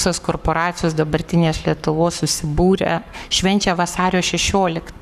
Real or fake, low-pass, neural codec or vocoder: fake; 14.4 kHz; codec, 44.1 kHz, 7.8 kbps, Pupu-Codec